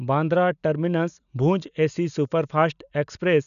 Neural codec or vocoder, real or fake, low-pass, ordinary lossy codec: none; real; 7.2 kHz; none